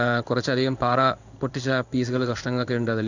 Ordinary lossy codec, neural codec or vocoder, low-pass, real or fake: none; codec, 16 kHz in and 24 kHz out, 1 kbps, XY-Tokenizer; 7.2 kHz; fake